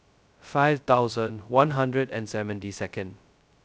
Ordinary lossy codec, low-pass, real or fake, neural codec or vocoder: none; none; fake; codec, 16 kHz, 0.2 kbps, FocalCodec